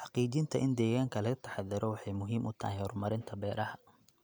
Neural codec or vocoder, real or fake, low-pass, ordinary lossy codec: none; real; none; none